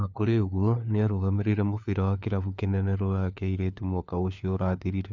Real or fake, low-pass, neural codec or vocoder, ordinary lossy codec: fake; 7.2 kHz; codec, 16 kHz, 4 kbps, FunCodec, trained on LibriTTS, 50 frames a second; none